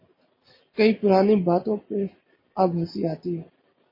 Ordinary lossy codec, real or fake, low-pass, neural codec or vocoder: MP3, 24 kbps; real; 5.4 kHz; none